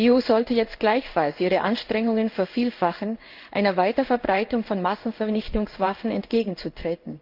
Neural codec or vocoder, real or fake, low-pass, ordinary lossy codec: codec, 16 kHz in and 24 kHz out, 1 kbps, XY-Tokenizer; fake; 5.4 kHz; Opus, 24 kbps